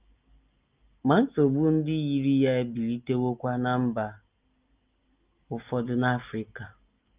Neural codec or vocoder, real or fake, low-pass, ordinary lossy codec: none; real; 3.6 kHz; Opus, 24 kbps